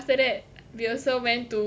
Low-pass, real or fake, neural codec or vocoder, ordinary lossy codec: none; real; none; none